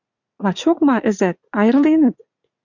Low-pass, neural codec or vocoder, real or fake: 7.2 kHz; vocoder, 44.1 kHz, 80 mel bands, Vocos; fake